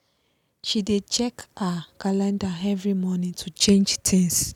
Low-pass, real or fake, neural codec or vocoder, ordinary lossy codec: 19.8 kHz; real; none; none